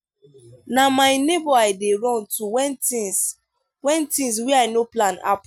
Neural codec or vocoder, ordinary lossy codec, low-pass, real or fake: none; none; none; real